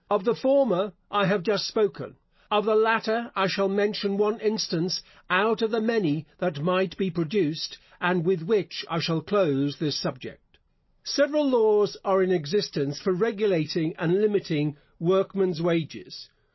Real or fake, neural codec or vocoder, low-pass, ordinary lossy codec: real; none; 7.2 kHz; MP3, 24 kbps